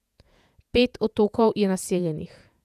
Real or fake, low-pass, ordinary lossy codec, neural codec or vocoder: fake; 14.4 kHz; none; vocoder, 48 kHz, 128 mel bands, Vocos